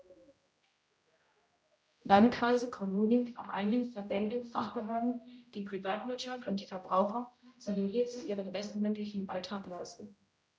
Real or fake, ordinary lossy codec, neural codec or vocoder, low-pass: fake; none; codec, 16 kHz, 0.5 kbps, X-Codec, HuBERT features, trained on general audio; none